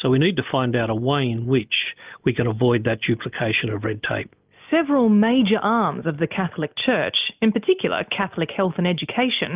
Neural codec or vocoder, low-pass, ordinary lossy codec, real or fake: none; 3.6 kHz; Opus, 24 kbps; real